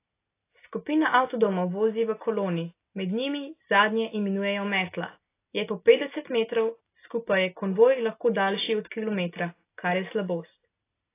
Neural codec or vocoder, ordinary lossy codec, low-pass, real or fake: none; AAC, 24 kbps; 3.6 kHz; real